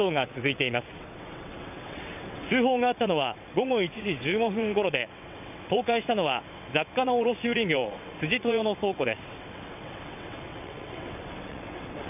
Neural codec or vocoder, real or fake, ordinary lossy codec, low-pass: vocoder, 22.05 kHz, 80 mel bands, WaveNeXt; fake; none; 3.6 kHz